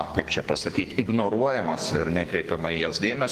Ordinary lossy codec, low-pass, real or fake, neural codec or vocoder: Opus, 24 kbps; 14.4 kHz; fake; codec, 44.1 kHz, 2.6 kbps, SNAC